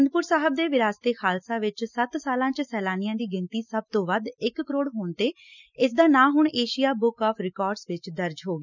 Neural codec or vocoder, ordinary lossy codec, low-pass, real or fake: none; none; 7.2 kHz; real